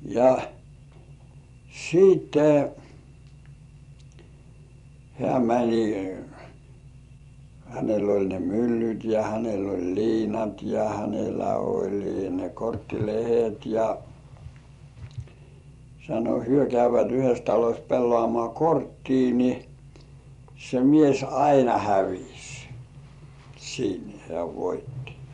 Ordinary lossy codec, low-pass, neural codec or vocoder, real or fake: none; 10.8 kHz; vocoder, 24 kHz, 100 mel bands, Vocos; fake